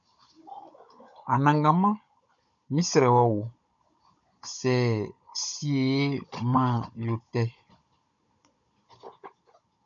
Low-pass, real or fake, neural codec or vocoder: 7.2 kHz; fake; codec, 16 kHz, 16 kbps, FunCodec, trained on Chinese and English, 50 frames a second